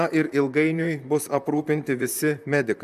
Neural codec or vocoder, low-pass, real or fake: vocoder, 44.1 kHz, 128 mel bands, Pupu-Vocoder; 14.4 kHz; fake